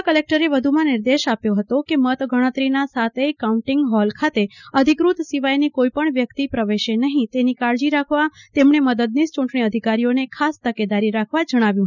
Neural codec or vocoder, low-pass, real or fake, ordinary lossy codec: none; 7.2 kHz; real; none